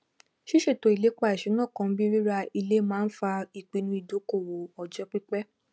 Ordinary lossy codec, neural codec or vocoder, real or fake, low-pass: none; none; real; none